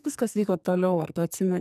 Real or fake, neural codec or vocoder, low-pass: fake; codec, 44.1 kHz, 2.6 kbps, SNAC; 14.4 kHz